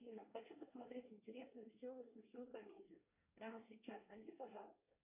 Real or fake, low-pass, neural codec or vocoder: fake; 3.6 kHz; codec, 24 kHz, 1 kbps, SNAC